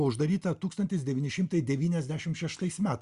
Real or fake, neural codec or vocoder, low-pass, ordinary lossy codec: real; none; 10.8 kHz; Opus, 64 kbps